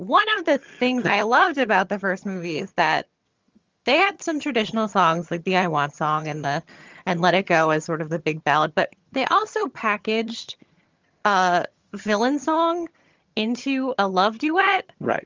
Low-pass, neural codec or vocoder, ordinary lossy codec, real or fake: 7.2 kHz; vocoder, 22.05 kHz, 80 mel bands, HiFi-GAN; Opus, 32 kbps; fake